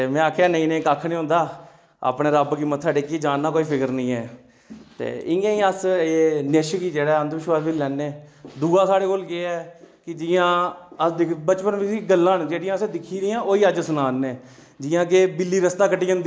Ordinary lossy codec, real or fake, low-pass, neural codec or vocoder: none; real; none; none